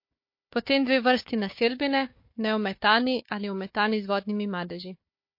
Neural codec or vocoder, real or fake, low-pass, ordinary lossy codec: codec, 16 kHz, 4 kbps, FunCodec, trained on Chinese and English, 50 frames a second; fake; 5.4 kHz; MP3, 32 kbps